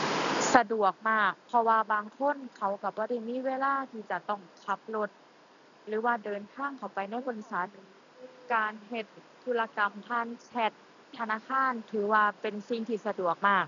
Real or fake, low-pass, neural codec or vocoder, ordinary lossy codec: real; 7.2 kHz; none; none